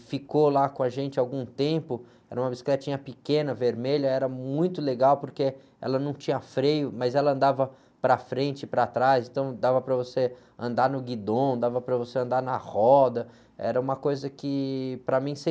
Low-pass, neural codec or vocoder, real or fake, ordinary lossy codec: none; none; real; none